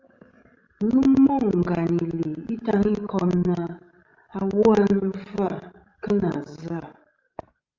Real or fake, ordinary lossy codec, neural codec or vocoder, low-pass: fake; Opus, 32 kbps; codec, 16 kHz, 16 kbps, FreqCodec, larger model; 7.2 kHz